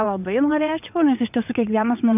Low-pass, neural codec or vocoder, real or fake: 3.6 kHz; vocoder, 22.05 kHz, 80 mel bands, WaveNeXt; fake